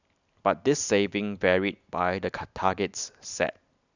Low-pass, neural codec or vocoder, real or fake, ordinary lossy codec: 7.2 kHz; none; real; none